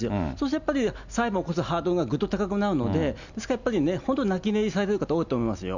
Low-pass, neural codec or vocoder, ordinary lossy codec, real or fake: 7.2 kHz; none; none; real